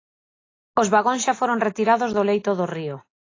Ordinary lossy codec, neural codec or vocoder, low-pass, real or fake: AAC, 32 kbps; none; 7.2 kHz; real